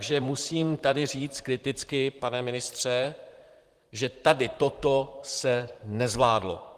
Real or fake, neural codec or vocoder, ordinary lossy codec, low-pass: fake; vocoder, 44.1 kHz, 128 mel bands, Pupu-Vocoder; Opus, 24 kbps; 14.4 kHz